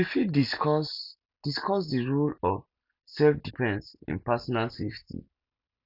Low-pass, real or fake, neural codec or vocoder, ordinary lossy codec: 5.4 kHz; real; none; none